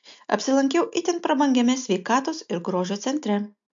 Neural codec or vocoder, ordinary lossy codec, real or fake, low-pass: none; MP3, 48 kbps; real; 7.2 kHz